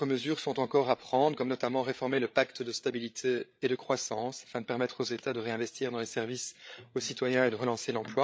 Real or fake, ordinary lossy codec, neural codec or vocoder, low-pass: fake; none; codec, 16 kHz, 8 kbps, FreqCodec, larger model; none